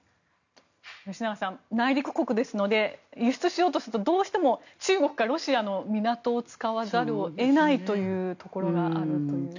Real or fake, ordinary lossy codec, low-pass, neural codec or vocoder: real; none; 7.2 kHz; none